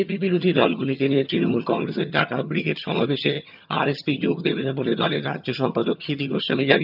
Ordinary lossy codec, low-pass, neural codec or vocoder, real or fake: none; 5.4 kHz; vocoder, 22.05 kHz, 80 mel bands, HiFi-GAN; fake